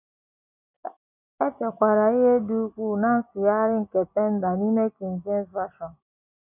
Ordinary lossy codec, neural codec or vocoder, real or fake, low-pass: none; none; real; 3.6 kHz